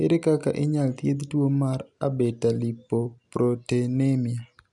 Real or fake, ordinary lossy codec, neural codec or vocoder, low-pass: real; none; none; 10.8 kHz